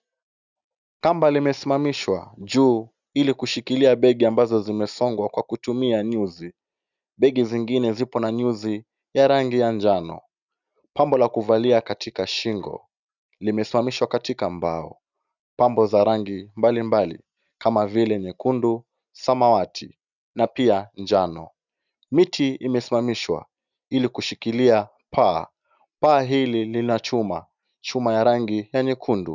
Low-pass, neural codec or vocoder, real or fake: 7.2 kHz; none; real